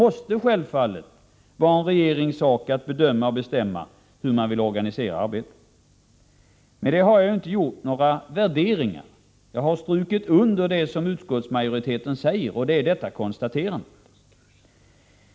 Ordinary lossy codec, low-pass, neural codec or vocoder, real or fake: none; none; none; real